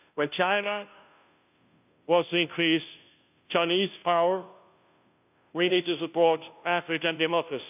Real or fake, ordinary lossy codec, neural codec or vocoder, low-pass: fake; none; codec, 16 kHz, 0.5 kbps, FunCodec, trained on Chinese and English, 25 frames a second; 3.6 kHz